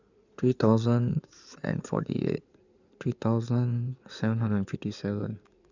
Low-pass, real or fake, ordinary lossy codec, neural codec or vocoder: 7.2 kHz; fake; none; codec, 16 kHz in and 24 kHz out, 2.2 kbps, FireRedTTS-2 codec